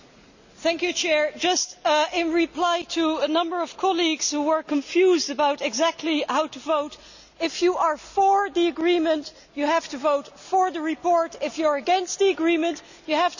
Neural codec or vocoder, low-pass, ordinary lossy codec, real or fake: none; 7.2 kHz; none; real